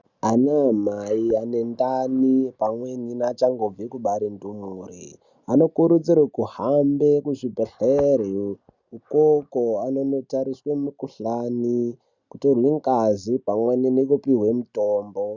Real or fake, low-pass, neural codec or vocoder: real; 7.2 kHz; none